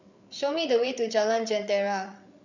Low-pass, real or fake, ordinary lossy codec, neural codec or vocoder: 7.2 kHz; fake; none; codec, 16 kHz, 8 kbps, FreqCodec, larger model